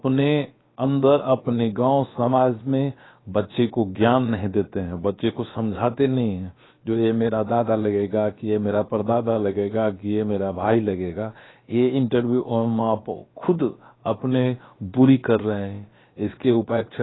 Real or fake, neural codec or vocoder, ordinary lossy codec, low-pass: fake; codec, 16 kHz, about 1 kbps, DyCAST, with the encoder's durations; AAC, 16 kbps; 7.2 kHz